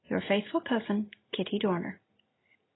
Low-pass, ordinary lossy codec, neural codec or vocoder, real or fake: 7.2 kHz; AAC, 16 kbps; none; real